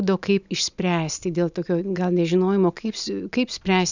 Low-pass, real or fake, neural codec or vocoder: 7.2 kHz; real; none